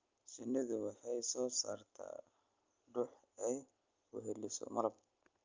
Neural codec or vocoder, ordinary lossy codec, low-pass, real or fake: none; Opus, 32 kbps; 7.2 kHz; real